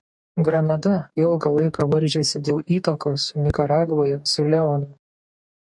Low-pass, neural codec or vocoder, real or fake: 10.8 kHz; codec, 44.1 kHz, 2.6 kbps, DAC; fake